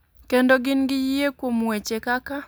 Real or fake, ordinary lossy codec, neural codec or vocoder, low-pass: real; none; none; none